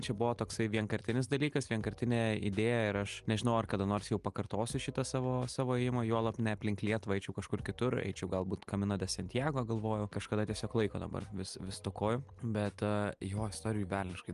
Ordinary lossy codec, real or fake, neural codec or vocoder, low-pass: Opus, 32 kbps; real; none; 10.8 kHz